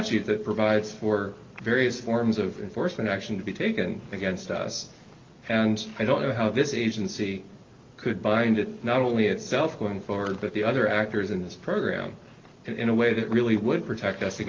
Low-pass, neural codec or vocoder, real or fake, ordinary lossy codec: 7.2 kHz; none; real; Opus, 32 kbps